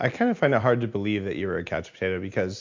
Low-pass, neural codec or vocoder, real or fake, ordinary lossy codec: 7.2 kHz; none; real; MP3, 64 kbps